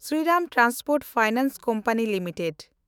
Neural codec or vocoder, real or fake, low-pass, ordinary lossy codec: autoencoder, 48 kHz, 128 numbers a frame, DAC-VAE, trained on Japanese speech; fake; none; none